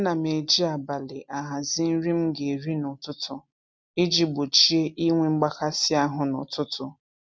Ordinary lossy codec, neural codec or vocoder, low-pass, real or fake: none; none; none; real